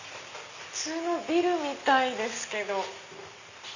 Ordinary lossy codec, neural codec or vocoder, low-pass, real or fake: none; none; 7.2 kHz; real